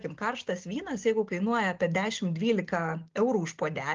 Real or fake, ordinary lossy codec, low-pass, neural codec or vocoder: real; Opus, 32 kbps; 7.2 kHz; none